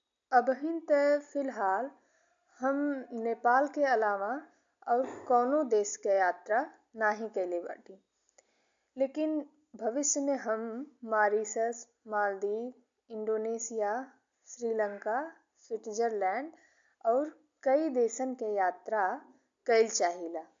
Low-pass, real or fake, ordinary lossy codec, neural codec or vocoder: 7.2 kHz; real; AAC, 64 kbps; none